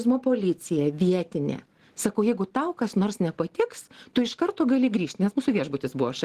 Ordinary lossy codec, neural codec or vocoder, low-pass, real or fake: Opus, 16 kbps; none; 14.4 kHz; real